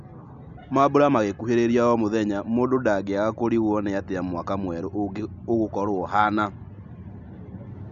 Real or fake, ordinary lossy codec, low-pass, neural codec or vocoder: real; none; 7.2 kHz; none